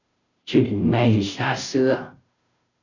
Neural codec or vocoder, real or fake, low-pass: codec, 16 kHz, 0.5 kbps, FunCodec, trained on Chinese and English, 25 frames a second; fake; 7.2 kHz